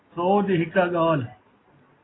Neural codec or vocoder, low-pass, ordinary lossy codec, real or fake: none; 7.2 kHz; AAC, 16 kbps; real